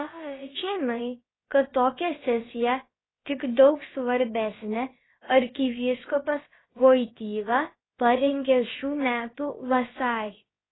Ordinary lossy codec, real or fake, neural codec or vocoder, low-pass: AAC, 16 kbps; fake; codec, 16 kHz, about 1 kbps, DyCAST, with the encoder's durations; 7.2 kHz